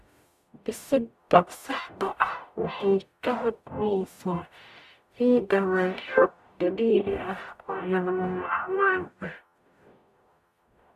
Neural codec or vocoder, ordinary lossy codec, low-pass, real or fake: codec, 44.1 kHz, 0.9 kbps, DAC; none; 14.4 kHz; fake